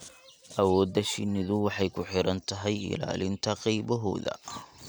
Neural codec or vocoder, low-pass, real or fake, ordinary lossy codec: none; none; real; none